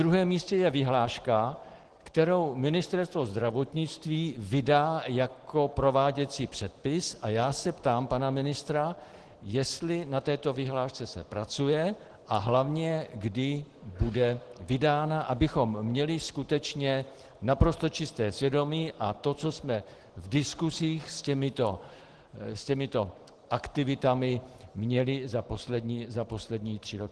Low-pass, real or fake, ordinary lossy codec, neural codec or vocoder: 10.8 kHz; real; Opus, 16 kbps; none